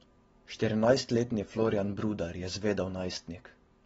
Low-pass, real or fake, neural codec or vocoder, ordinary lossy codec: 10.8 kHz; real; none; AAC, 24 kbps